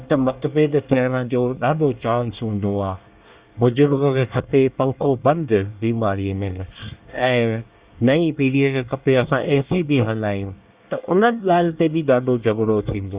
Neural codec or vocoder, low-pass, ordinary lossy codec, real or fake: codec, 24 kHz, 1 kbps, SNAC; 3.6 kHz; Opus, 64 kbps; fake